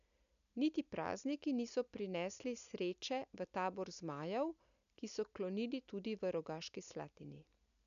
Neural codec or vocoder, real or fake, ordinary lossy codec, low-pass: none; real; none; 7.2 kHz